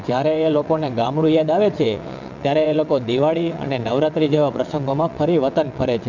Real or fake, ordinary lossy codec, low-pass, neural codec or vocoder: fake; none; 7.2 kHz; vocoder, 22.05 kHz, 80 mel bands, WaveNeXt